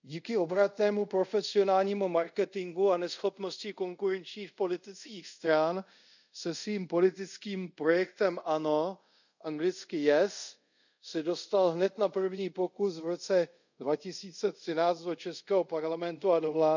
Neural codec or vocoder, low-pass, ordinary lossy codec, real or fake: codec, 24 kHz, 0.5 kbps, DualCodec; 7.2 kHz; none; fake